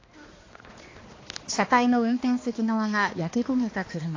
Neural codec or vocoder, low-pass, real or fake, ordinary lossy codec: codec, 16 kHz, 2 kbps, X-Codec, HuBERT features, trained on balanced general audio; 7.2 kHz; fake; AAC, 32 kbps